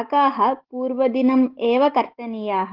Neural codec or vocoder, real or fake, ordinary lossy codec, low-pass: none; real; Opus, 16 kbps; 5.4 kHz